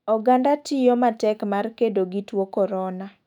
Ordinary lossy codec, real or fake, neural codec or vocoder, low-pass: none; fake; autoencoder, 48 kHz, 128 numbers a frame, DAC-VAE, trained on Japanese speech; 19.8 kHz